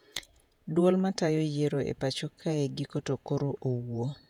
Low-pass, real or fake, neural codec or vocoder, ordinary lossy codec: 19.8 kHz; fake; vocoder, 48 kHz, 128 mel bands, Vocos; none